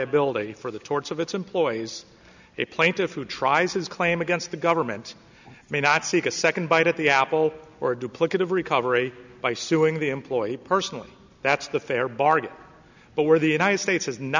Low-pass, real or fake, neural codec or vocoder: 7.2 kHz; real; none